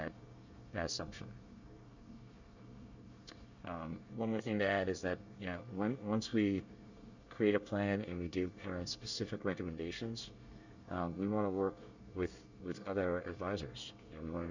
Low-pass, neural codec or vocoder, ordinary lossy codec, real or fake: 7.2 kHz; codec, 24 kHz, 1 kbps, SNAC; Opus, 64 kbps; fake